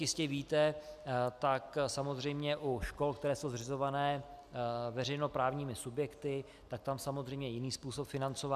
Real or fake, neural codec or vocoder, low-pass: real; none; 14.4 kHz